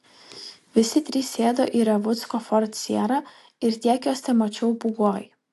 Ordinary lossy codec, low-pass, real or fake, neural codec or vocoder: AAC, 64 kbps; 14.4 kHz; real; none